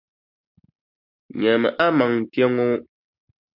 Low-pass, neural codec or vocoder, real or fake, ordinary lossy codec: 5.4 kHz; none; real; MP3, 48 kbps